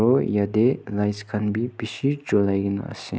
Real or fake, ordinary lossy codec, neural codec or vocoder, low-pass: real; none; none; none